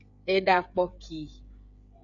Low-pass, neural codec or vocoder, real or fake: 7.2 kHz; codec, 16 kHz, 16 kbps, FreqCodec, smaller model; fake